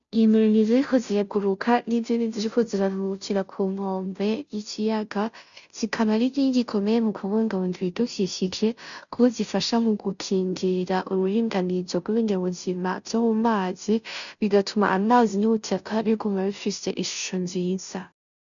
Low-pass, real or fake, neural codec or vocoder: 7.2 kHz; fake; codec, 16 kHz, 0.5 kbps, FunCodec, trained on Chinese and English, 25 frames a second